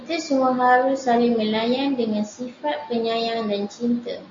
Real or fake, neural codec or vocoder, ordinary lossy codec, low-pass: real; none; AAC, 48 kbps; 7.2 kHz